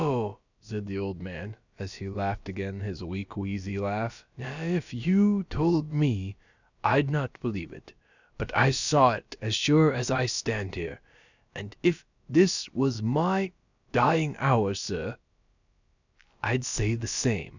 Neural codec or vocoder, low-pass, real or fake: codec, 16 kHz, about 1 kbps, DyCAST, with the encoder's durations; 7.2 kHz; fake